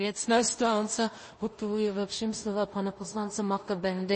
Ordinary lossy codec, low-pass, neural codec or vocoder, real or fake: MP3, 32 kbps; 9.9 kHz; codec, 16 kHz in and 24 kHz out, 0.4 kbps, LongCat-Audio-Codec, two codebook decoder; fake